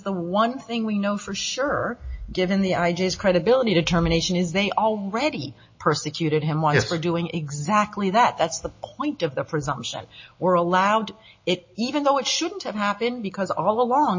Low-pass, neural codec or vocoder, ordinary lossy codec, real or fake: 7.2 kHz; none; MP3, 32 kbps; real